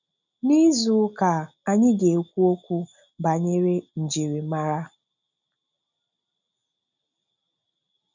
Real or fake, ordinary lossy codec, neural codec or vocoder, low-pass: real; none; none; 7.2 kHz